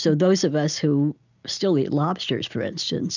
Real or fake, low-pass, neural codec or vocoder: real; 7.2 kHz; none